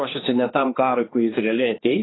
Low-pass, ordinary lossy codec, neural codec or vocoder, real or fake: 7.2 kHz; AAC, 16 kbps; codec, 16 kHz, 2 kbps, X-Codec, HuBERT features, trained on general audio; fake